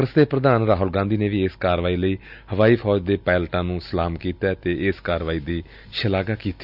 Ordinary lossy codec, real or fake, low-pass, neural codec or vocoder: none; real; 5.4 kHz; none